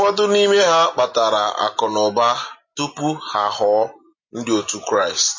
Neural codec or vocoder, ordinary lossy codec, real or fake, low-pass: none; MP3, 32 kbps; real; 7.2 kHz